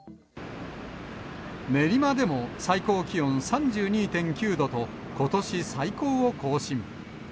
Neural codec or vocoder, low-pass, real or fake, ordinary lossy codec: none; none; real; none